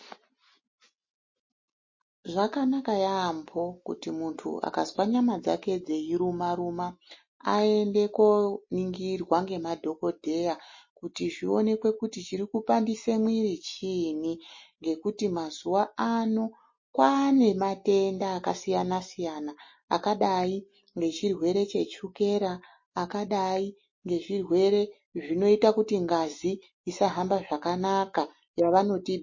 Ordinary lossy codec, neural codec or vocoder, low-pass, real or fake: MP3, 32 kbps; none; 7.2 kHz; real